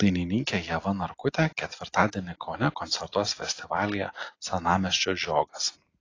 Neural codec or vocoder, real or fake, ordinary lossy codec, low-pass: none; real; AAC, 32 kbps; 7.2 kHz